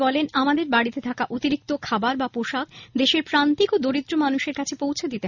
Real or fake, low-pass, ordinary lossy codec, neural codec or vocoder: real; 7.2 kHz; none; none